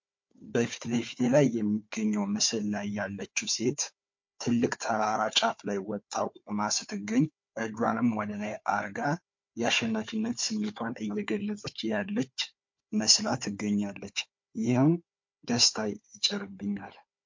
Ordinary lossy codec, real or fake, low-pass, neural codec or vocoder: MP3, 48 kbps; fake; 7.2 kHz; codec, 16 kHz, 4 kbps, FunCodec, trained on Chinese and English, 50 frames a second